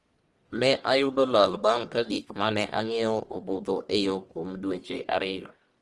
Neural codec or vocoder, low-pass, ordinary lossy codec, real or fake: codec, 44.1 kHz, 1.7 kbps, Pupu-Codec; 10.8 kHz; Opus, 24 kbps; fake